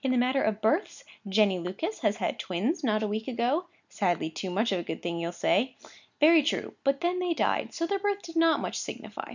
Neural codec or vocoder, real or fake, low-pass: none; real; 7.2 kHz